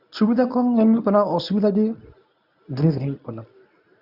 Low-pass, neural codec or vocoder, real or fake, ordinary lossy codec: 5.4 kHz; codec, 24 kHz, 0.9 kbps, WavTokenizer, medium speech release version 1; fake; none